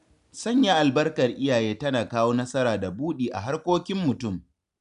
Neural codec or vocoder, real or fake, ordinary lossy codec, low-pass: none; real; none; 10.8 kHz